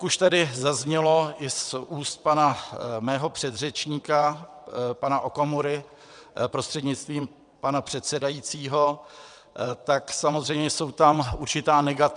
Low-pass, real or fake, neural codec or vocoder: 9.9 kHz; fake; vocoder, 22.05 kHz, 80 mel bands, WaveNeXt